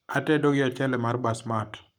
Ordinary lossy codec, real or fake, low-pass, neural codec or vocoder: none; fake; 19.8 kHz; codec, 44.1 kHz, 7.8 kbps, Pupu-Codec